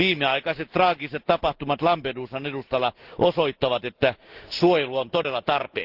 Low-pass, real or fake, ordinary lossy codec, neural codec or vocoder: 5.4 kHz; real; Opus, 16 kbps; none